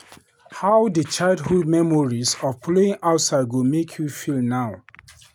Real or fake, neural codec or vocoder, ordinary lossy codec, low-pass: real; none; none; 19.8 kHz